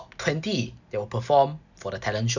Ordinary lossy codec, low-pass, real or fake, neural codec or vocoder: none; 7.2 kHz; real; none